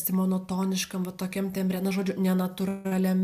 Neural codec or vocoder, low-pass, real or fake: none; 14.4 kHz; real